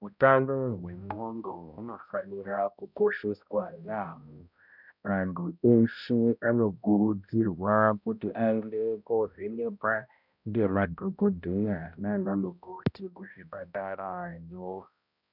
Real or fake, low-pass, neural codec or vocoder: fake; 5.4 kHz; codec, 16 kHz, 0.5 kbps, X-Codec, HuBERT features, trained on balanced general audio